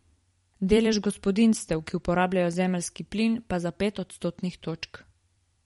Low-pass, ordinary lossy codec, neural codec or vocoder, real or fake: 19.8 kHz; MP3, 48 kbps; vocoder, 44.1 kHz, 128 mel bands every 256 samples, BigVGAN v2; fake